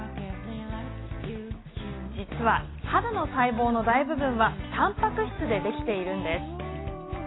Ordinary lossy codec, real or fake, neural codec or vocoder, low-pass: AAC, 16 kbps; real; none; 7.2 kHz